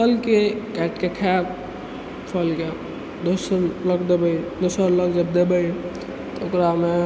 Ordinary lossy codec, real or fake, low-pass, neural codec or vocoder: none; real; none; none